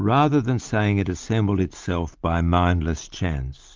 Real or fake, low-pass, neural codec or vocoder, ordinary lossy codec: real; 7.2 kHz; none; Opus, 32 kbps